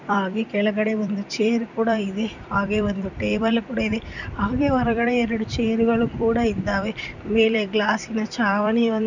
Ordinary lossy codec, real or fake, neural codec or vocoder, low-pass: none; fake; vocoder, 44.1 kHz, 128 mel bands every 256 samples, BigVGAN v2; 7.2 kHz